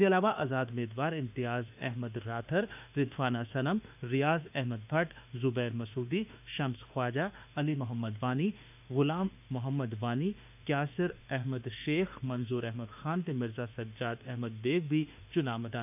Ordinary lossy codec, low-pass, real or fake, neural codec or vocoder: none; 3.6 kHz; fake; autoencoder, 48 kHz, 32 numbers a frame, DAC-VAE, trained on Japanese speech